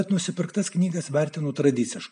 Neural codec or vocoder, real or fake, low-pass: none; real; 9.9 kHz